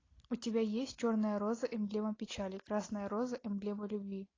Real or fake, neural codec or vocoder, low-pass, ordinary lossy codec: real; none; 7.2 kHz; AAC, 32 kbps